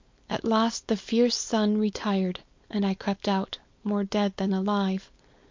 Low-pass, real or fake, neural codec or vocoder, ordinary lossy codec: 7.2 kHz; fake; codec, 16 kHz, 4.8 kbps, FACodec; MP3, 48 kbps